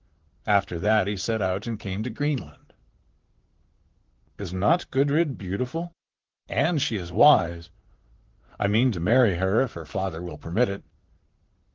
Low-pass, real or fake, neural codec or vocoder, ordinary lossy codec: 7.2 kHz; fake; vocoder, 44.1 kHz, 128 mel bands, Pupu-Vocoder; Opus, 16 kbps